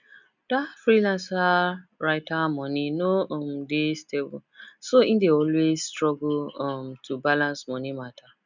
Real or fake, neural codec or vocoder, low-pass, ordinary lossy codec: real; none; 7.2 kHz; none